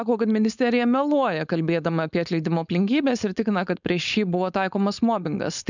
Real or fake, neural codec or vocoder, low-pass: fake; codec, 16 kHz, 4.8 kbps, FACodec; 7.2 kHz